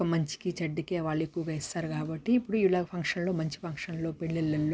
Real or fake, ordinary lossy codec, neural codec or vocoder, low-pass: real; none; none; none